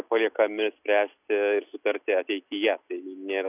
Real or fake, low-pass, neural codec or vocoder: real; 3.6 kHz; none